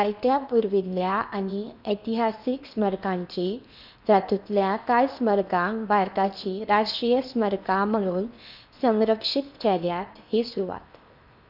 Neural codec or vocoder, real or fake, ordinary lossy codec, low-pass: codec, 16 kHz in and 24 kHz out, 0.8 kbps, FocalCodec, streaming, 65536 codes; fake; none; 5.4 kHz